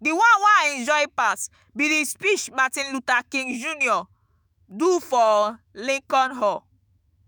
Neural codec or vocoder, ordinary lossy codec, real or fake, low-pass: autoencoder, 48 kHz, 128 numbers a frame, DAC-VAE, trained on Japanese speech; none; fake; none